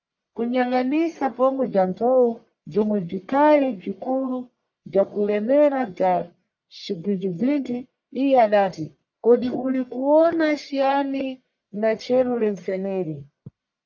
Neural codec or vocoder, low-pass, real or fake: codec, 44.1 kHz, 1.7 kbps, Pupu-Codec; 7.2 kHz; fake